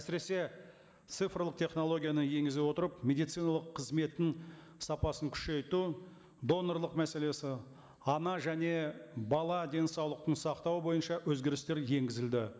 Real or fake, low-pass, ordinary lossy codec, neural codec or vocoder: real; none; none; none